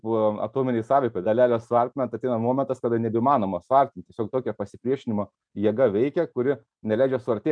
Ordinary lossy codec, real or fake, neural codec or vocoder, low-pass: MP3, 96 kbps; real; none; 9.9 kHz